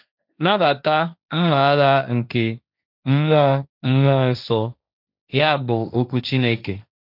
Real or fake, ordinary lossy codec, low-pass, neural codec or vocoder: fake; none; 5.4 kHz; codec, 16 kHz, 1.1 kbps, Voila-Tokenizer